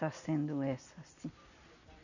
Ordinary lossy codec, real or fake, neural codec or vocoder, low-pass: none; real; none; 7.2 kHz